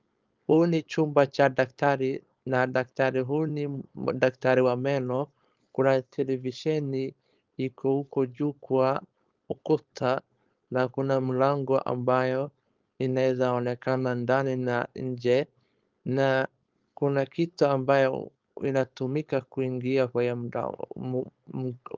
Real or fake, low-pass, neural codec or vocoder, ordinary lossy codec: fake; 7.2 kHz; codec, 16 kHz, 4.8 kbps, FACodec; Opus, 24 kbps